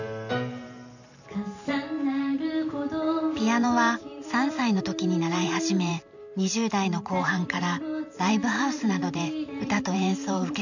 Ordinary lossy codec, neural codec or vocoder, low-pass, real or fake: none; vocoder, 44.1 kHz, 128 mel bands every 512 samples, BigVGAN v2; 7.2 kHz; fake